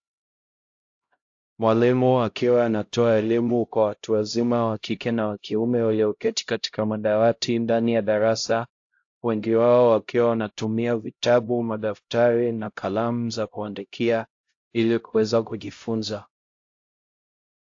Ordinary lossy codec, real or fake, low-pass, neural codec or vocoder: AAC, 48 kbps; fake; 7.2 kHz; codec, 16 kHz, 0.5 kbps, X-Codec, HuBERT features, trained on LibriSpeech